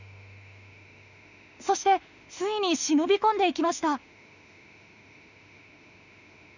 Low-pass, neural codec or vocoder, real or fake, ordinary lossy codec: 7.2 kHz; autoencoder, 48 kHz, 32 numbers a frame, DAC-VAE, trained on Japanese speech; fake; none